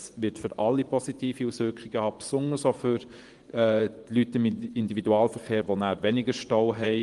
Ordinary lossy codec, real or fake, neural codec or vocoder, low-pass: Opus, 24 kbps; fake; vocoder, 24 kHz, 100 mel bands, Vocos; 10.8 kHz